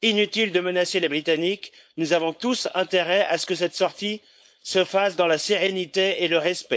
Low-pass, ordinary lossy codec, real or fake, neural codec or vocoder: none; none; fake; codec, 16 kHz, 4.8 kbps, FACodec